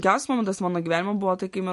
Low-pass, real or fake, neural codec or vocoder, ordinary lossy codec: 14.4 kHz; real; none; MP3, 48 kbps